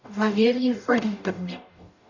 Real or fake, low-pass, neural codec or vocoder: fake; 7.2 kHz; codec, 44.1 kHz, 0.9 kbps, DAC